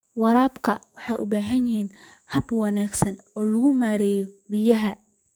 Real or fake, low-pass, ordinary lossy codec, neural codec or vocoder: fake; none; none; codec, 44.1 kHz, 2.6 kbps, SNAC